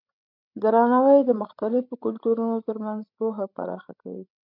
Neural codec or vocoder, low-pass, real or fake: codec, 16 kHz, 16 kbps, FreqCodec, larger model; 5.4 kHz; fake